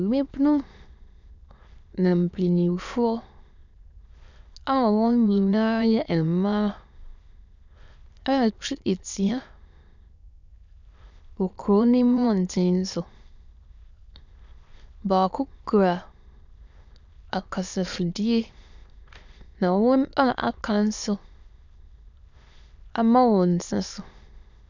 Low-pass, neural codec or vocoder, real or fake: 7.2 kHz; autoencoder, 22.05 kHz, a latent of 192 numbers a frame, VITS, trained on many speakers; fake